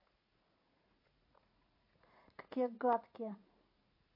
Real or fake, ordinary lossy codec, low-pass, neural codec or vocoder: fake; MP3, 32 kbps; 5.4 kHz; vocoder, 44.1 kHz, 80 mel bands, Vocos